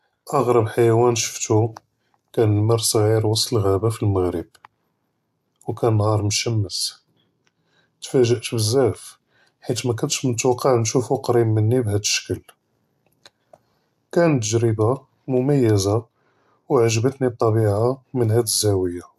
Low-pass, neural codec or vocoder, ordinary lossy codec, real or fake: 14.4 kHz; none; AAC, 96 kbps; real